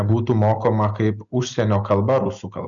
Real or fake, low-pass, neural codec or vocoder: real; 7.2 kHz; none